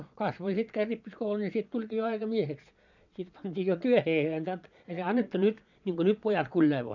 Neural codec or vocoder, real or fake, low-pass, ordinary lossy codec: vocoder, 22.05 kHz, 80 mel bands, Vocos; fake; 7.2 kHz; none